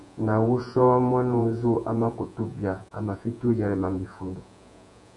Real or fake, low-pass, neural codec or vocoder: fake; 10.8 kHz; vocoder, 48 kHz, 128 mel bands, Vocos